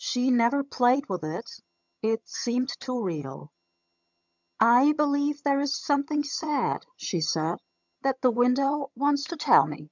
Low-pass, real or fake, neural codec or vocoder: 7.2 kHz; fake; vocoder, 22.05 kHz, 80 mel bands, HiFi-GAN